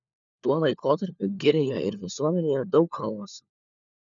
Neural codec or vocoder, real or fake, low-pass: codec, 16 kHz, 4 kbps, FunCodec, trained on LibriTTS, 50 frames a second; fake; 7.2 kHz